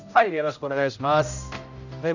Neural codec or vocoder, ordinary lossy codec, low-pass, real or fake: codec, 16 kHz, 0.5 kbps, X-Codec, HuBERT features, trained on general audio; none; 7.2 kHz; fake